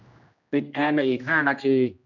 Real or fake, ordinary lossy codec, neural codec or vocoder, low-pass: fake; none; codec, 16 kHz, 1 kbps, X-Codec, HuBERT features, trained on general audio; 7.2 kHz